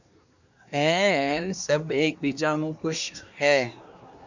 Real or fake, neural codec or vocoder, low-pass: fake; codec, 24 kHz, 1 kbps, SNAC; 7.2 kHz